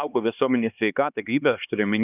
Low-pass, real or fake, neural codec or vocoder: 3.6 kHz; fake; codec, 16 kHz, 2 kbps, X-Codec, HuBERT features, trained on LibriSpeech